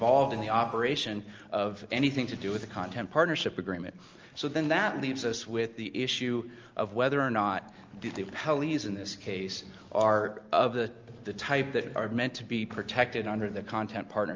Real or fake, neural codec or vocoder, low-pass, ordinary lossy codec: real; none; 7.2 kHz; Opus, 24 kbps